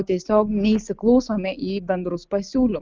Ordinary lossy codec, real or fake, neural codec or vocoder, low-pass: Opus, 24 kbps; real; none; 7.2 kHz